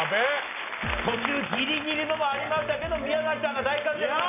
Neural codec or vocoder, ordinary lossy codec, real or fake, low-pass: none; none; real; 3.6 kHz